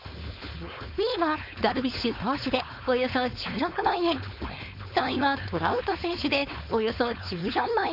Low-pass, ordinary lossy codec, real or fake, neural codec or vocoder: 5.4 kHz; MP3, 48 kbps; fake; codec, 16 kHz, 4.8 kbps, FACodec